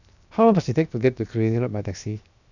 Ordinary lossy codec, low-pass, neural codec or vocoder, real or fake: none; 7.2 kHz; codec, 16 kHz, 0.7 kbps, FocalCodec; fake